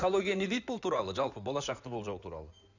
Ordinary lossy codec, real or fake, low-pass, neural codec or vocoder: none; fake; 7.2 kHz; codec, 16 kHz in and 24 kHz out, 2.2 kbps, FireRedTTS-2 codec